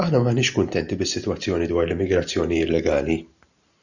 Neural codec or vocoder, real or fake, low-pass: none; real; 7.2 kHz